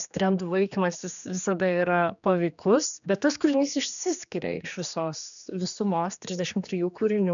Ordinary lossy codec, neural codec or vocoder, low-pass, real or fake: MP3, 96 kbps; codec, 16 kHz, 2 kbps, X-Codec, HuBERT features, trained on general audio; 7.2 kHz; fake